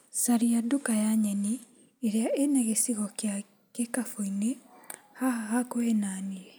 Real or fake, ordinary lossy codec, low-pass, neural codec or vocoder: real; none; none; none